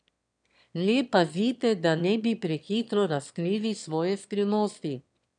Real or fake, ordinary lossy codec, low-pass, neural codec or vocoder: fake; none; 9.9 kHz; autoencoder, 22.05 kHz, a latent of 192 numbers a frame, VITS, trained on one speaker